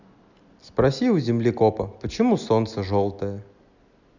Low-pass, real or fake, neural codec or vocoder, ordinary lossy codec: 7.2 kHz; real; none; none